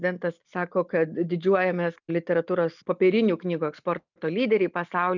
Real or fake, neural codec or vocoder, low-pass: real; none; 7.2 kHz